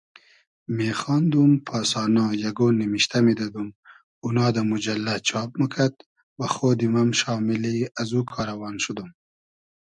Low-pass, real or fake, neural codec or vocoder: 10.8 kHz; real; none